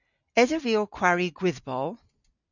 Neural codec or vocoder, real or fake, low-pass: none; real; 7.2 kHz